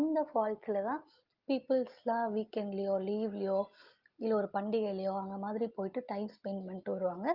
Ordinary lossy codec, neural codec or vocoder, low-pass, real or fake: Opus, 32 kbps; none; 5.4 kHz; real